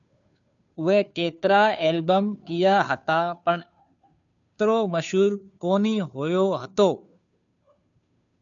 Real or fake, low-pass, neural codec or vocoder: fake; 7.2 kHz; codec, 16 kHz, 2 kbps, FunCodec, trained on Chinese and English, 25 frames a second